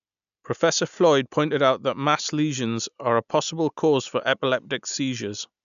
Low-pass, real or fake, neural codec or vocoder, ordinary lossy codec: 7.2 kHz; real; none; none